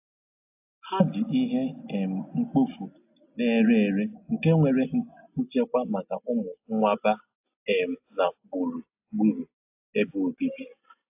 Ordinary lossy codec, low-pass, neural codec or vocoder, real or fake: AAC, 32 kbps; 3.6 kHz; none; real